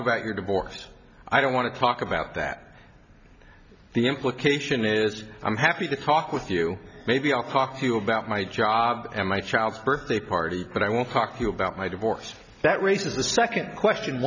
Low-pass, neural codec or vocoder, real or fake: 7.2 kHz; none; real